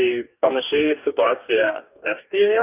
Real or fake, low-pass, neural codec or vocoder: fake; 3.6 kHz; codec, 44.1 kHz, 2.6 kbps, DAC